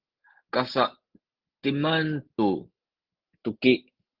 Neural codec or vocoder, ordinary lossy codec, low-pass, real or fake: none; Opus, 16 kbps; 5.4 kHz; real